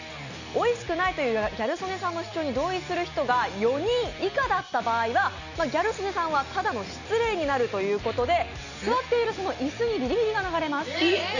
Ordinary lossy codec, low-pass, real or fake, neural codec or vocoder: none; 7.2 kHz; real; none